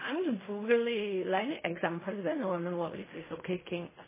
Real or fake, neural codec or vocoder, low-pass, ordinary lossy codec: fake; codec, 16 kHz in and 24 kHz out, 0.4 kbps, LongCat-Audio-Codec, fine tuned four codebook decoder; 3.6 kHz; MP3, 16 kbps